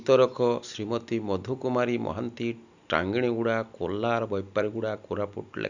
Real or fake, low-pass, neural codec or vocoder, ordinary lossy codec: real; 7.2 kHz; none; none